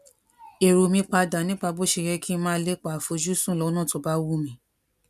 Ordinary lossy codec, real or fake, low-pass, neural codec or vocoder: none; real; 14.4 kHz; none